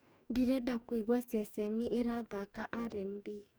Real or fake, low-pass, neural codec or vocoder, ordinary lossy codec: fake; none; codec, 44.1 kHz, 2.6 kbps, DAC; none